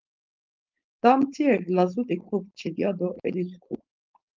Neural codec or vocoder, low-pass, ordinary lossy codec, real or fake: codec, 16 kHz, 4.8 kbps, FACodec; 7.2 kHz; Opus, 24 kbps; fake